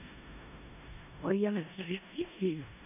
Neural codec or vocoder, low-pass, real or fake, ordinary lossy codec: codec, 16 kHz in and 24 kHz out, 0.4 kbps, LongCat-Audio-Codec, four codebook decoder; 3.6 kHz; fake; none